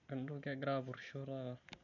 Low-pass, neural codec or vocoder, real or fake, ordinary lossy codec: 7.2 kHz; none; real; none